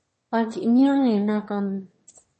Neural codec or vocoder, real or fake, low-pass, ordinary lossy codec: autoencoder, 22.05 kHz, a latent of 192 numbers a frame, VITS, trained on one speaker; fake; 9.9 kHz; MP3, 32 kbps